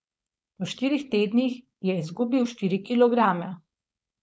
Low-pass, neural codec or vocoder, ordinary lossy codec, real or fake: none; codec, 16 kHz, 4.8 kbps, FACodec; none; fake